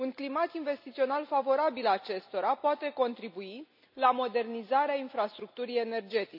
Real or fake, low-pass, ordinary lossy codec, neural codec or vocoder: real; 5.4 kHz; none; none